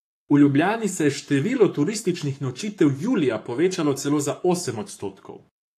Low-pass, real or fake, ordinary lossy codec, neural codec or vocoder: 14.4 kHz; fake; AAC, 64 kbps; codec, 44.1 kHz, 7.8 kbps, Pupu-Codec